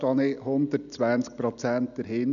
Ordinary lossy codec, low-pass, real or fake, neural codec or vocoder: none; 7.2 kHz; real; none